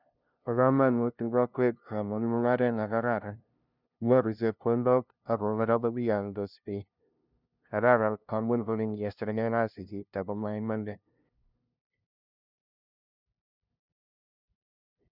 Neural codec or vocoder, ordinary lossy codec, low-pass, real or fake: codec, 16 kHz, 0.5 kbps, FunCodec, trained on LibriTTS, 25 frames a second; none; 5.4 kHz; fake